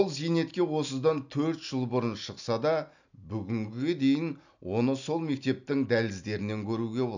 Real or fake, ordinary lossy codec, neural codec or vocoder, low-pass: real; none; none; 7.2 kHz